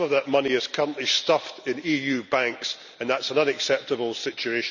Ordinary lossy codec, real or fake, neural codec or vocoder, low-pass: none; real; none; 7.2 kHz